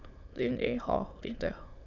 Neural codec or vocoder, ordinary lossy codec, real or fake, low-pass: autoencoder, 22.05 kHz, a latent of 192 numbers a frame, VITS, trained on many speakers; none; fake; 7.2 kHz